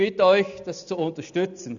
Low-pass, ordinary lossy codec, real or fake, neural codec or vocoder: 7.2 kHz; none; real; none